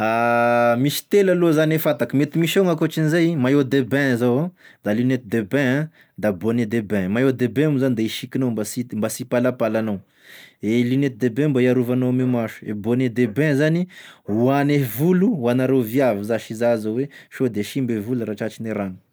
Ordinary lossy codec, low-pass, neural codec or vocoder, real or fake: none; none; none; real